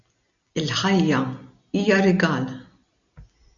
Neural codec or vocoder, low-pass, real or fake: none; 7.2 kHz; real